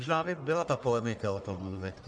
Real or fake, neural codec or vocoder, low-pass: fake; codec, 44.1 kHz, 1.7 kbps, Pupu-Codec; 9.9 kHz